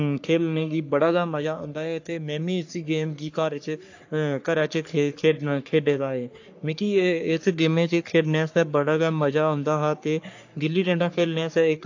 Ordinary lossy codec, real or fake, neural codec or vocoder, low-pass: AAC, 48 kbps; fake; codec, 44.1 kHz, 3.4 kbps, Pupu-Codec; 7.2 kHz